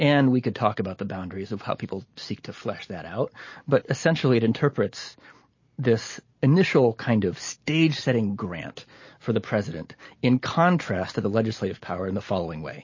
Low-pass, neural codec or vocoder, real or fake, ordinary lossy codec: 7.2 kHz; none; real; MP3, 32 kbps